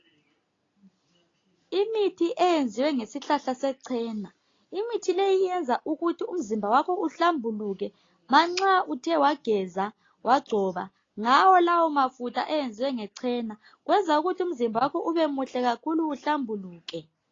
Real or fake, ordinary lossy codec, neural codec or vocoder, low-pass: real; AAC, 32 kbps; none; 7.2 kHz